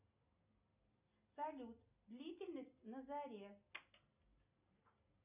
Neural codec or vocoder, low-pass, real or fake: none; 3.6 kHz; real